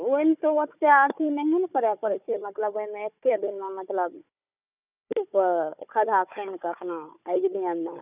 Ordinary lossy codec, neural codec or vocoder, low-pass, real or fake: none; codec, 16 kHz, 4 kbps, FunCodec, trained on Chinese and English, 50 frames a second; 3.6 kHz; fake